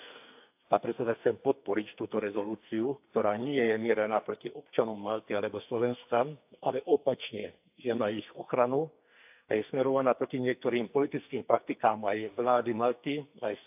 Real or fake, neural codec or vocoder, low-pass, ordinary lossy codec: fake; codec, 44.1 kHz, 2.6 kbps, SNAC; 3.6 kHz; AAC, 32 kbps